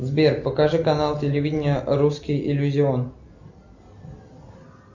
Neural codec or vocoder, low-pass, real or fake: none; 7.2 kHz; real